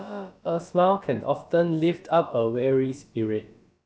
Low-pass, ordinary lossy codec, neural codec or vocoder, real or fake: none; none; codec, 16 kHz, about 1 kbps, DyCAST, with the encoder's durations; fake